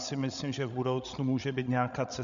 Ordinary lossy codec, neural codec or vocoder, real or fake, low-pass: MP3, 96 kbps; codec, 16 kHz, 16 kbps, FreqCodec, larger model; fake; 7.2 kHz